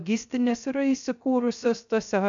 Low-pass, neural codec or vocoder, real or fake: 7.2 kHz; codec, 16 kHz, 0.3 kbps, FocalCodec; fake